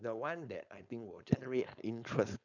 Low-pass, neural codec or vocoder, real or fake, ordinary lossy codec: 7.2 kHz; codec, 16 kHz, 4.8 kbps, FACodec; fake; none